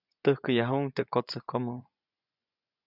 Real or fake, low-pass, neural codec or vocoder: fake; 5.4 kHz; vocoder, 24 kHz, 100 mel bands, Vocos